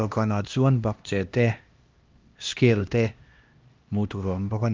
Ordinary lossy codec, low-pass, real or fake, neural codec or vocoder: Opus, 32 kbps; 7.2 kHz; fake; codec, 16 kHz, 1 kbps, X-Codec, HuBERT features, trained on LibriSpeech